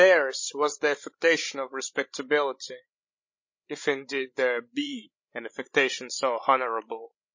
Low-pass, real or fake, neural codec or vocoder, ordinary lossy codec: 7.2 kHz; fake; codec, 16 kHz, 16 kbps, FreqCodec, larger model; MP3, 32 kbps